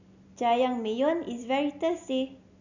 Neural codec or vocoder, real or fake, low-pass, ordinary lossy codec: none; real; 7.2 kHz; none